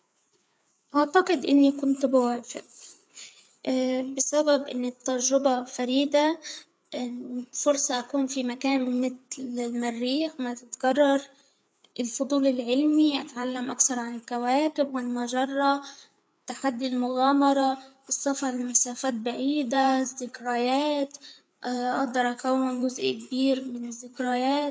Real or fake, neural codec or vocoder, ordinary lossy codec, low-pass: fake; codec, 16 kHz, 4 kbps, FreqCodec, larger model; none; none